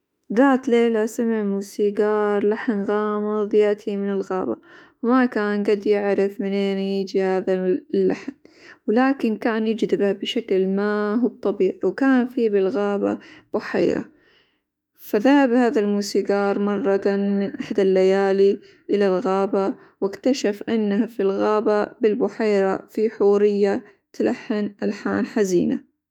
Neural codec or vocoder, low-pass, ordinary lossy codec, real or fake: autoencoder, 48 kHz, 32 numbers a frame, DAC-VAE, trained on Japanese speech; 19.8 kHz; none; fake